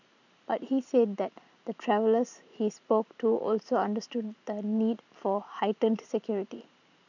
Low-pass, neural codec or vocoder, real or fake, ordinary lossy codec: 7.2 kHz; vocoder, 44.1 kHz, 128 mel bands every 512 samples, BigVGAN v2; fake; none